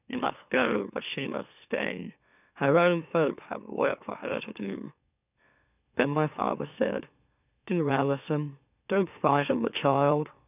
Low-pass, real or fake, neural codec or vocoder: 3.6 kHz; fake; autoencoder, 44.1 kHz, a latent of 192 numbers a frame, MeloTTS